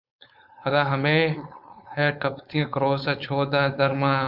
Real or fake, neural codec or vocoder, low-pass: fake; codec, 16 kHz, 4.8 kbps, FACodec; 5.4 kHz